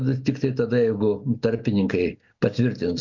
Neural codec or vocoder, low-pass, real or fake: none; 7.2 kHz; real